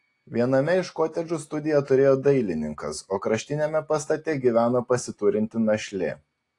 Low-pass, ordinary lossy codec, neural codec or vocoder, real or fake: 10.8 kHz; AAC, 48 kbps; none; real